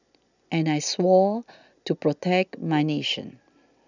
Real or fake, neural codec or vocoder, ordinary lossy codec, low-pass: real; none; none; 7.2 kHz